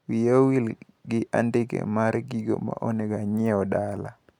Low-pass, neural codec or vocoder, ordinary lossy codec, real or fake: 19.8 kHz; none; none; real